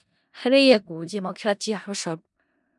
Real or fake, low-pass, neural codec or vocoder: fake; 10.8 kHz; codec, 16 kHz in and 24 kHz out, 0.4 kbps, LongCat-Audio-Codec, four codebook decoder